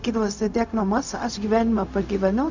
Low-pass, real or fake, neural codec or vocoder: 7.2 kHz; fake; codec, 16 kHz, 0.4 kbps, LongCat-Audio-Codec